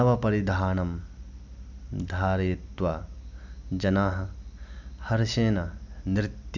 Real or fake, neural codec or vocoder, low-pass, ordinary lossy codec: real; none; 7.2 kHz; none